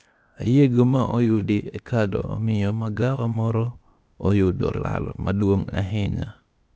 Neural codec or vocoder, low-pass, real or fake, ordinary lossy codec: codec, 16 kHz, 0.8 kbps, ZipCodec; none; fake; none